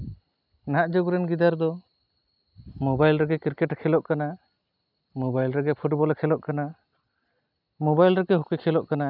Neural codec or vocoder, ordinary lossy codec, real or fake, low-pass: none; none; real; 5.4 kHz